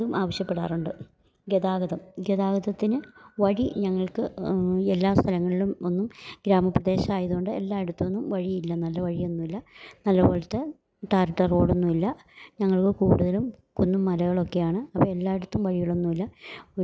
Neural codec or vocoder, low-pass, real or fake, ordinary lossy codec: none; none; real; none